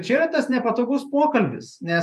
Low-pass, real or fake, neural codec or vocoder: 14.4 kHz; fake; vocoder, 48 kHz, 128 mel bands, Vocos